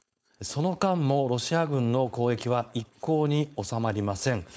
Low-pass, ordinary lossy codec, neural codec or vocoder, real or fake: none; none; codec, 16 kHz, 4.8 kbps, FACodec; fake